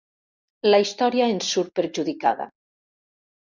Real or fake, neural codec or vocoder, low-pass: real; none; 7.2 kHz